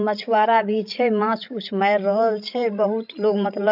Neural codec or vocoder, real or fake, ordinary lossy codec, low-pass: codec, 16 kHz, 16 kbps, FreqCodec, larger model; fake; none; 5.4 kHz